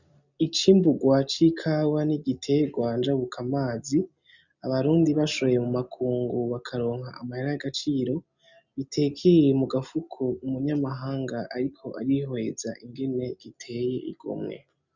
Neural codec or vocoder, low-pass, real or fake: none; 7.2 kHz; real